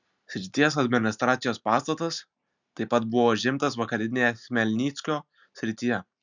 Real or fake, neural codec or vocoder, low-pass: real; none; 7.2 kHz